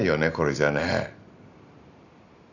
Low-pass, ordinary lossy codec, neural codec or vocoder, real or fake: 7.2 kHz; none; none; real